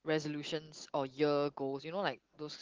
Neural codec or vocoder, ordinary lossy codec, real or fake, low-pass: none; Opus, 16 kbps; real; 7.2 kHz